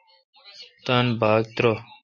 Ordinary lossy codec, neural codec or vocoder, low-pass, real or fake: MP3, 32 kbps; none; 7.2 kHz; real